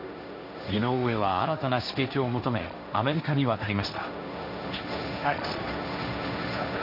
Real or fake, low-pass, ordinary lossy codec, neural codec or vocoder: fake; 5.4 kHz; none; codec, 16 kHz, 1.1 kbps, Voila-Tokenizer